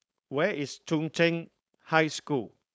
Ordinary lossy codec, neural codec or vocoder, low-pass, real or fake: none; codec, 16 kHz, 4.8 kbps, FACodec; none; fake